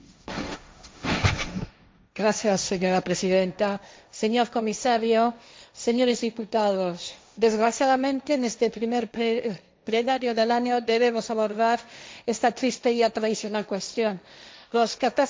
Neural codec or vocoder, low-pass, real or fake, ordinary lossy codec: codec, 16 kHz, 1.1 kbps, Voila-Tokenizer; none; fake; none